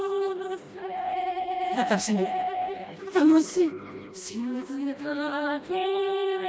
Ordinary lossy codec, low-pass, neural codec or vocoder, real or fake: none; none; codec, 16 kHz, 1 kbps, FreqCodec, smaller model; fake